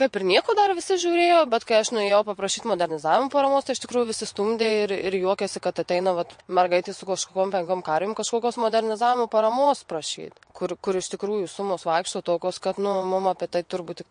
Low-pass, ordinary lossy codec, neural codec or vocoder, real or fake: 9.9 kHz; MP3, 48 kbps; vocoder, 22.05 kHz, 80 mel bands, WaveNeXt; fake